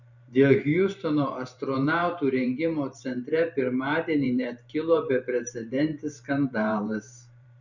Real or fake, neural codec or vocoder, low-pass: fake; vocoder, 44.1 kHz, 128 mel bands every 512 samples, BigVGAN v2; 7.2 kHz